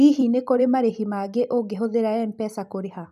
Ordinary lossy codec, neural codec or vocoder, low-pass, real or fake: none; vocoder, 44.1 kHz, 128 mel bands every 256 samples, BigVGAN v2; 14.4 kHz; fake